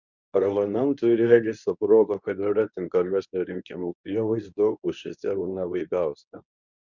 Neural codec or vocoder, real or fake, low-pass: codec, 24 kHz, 0.9 kbps, WavTokenizer, medium speech release version 2; fake; 7.2 kHz